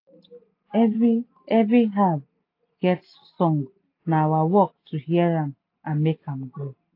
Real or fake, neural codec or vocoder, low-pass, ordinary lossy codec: real; none; 5.4 kHz; AAC, 32 kbps